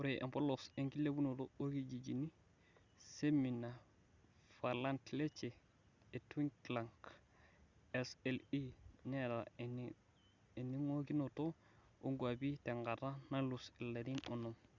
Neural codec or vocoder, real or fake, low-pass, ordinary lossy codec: none; real; 7.2 kHz; Opus, 64 kbps